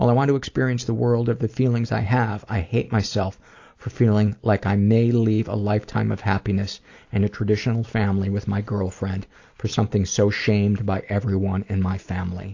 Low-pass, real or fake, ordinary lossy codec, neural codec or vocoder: 7.2 kHz; real; AAC, 48 kbps; none